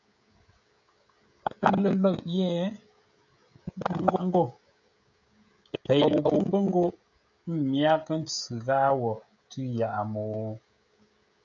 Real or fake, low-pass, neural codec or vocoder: fake; 7.2 kHz; codec, 16 kHz, 16 kbps, FreqCodec, smaller model